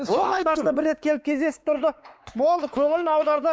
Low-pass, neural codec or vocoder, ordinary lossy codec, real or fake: none; codec, 16 kHz, 4 kbps, X-Codec, WavLM features, trained on Multilingual LibriSpeech; none; fake